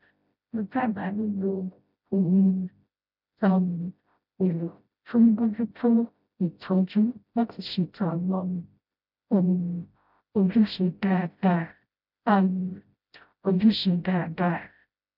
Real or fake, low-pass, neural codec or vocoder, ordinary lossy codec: fake; 5.4 kHz; codec, 16 kHz, 0.5 kbps, FreqCodec, smaller model; none